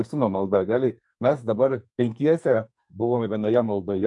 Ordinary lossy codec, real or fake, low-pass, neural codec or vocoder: AAC, 64 kbps; fake; 10.8 kHz; codec, 44.1 kHz, 2.6 kbps, SNAC